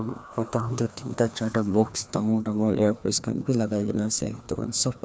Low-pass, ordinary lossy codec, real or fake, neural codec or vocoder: none; none; fake; codec, 16 kHz, 2 kbps, FreqCodec, larger model